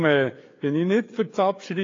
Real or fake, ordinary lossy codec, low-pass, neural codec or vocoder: fake; AAC, 32 kbps; 7.2 kHz; codec, 16 kHz, 4 kbps, FreqCodec, larger model